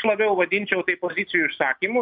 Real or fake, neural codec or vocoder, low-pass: real; none; 5.4 kHz